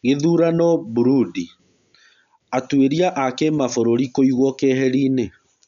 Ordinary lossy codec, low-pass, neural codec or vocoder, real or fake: none; 7.2 kHz; none; real